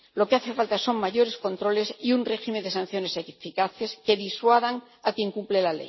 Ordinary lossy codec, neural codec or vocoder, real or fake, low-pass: MP3, 24 kbps; none; real; 7.2 kHz